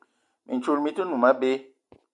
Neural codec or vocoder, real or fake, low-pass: none; real; 10.8 kHz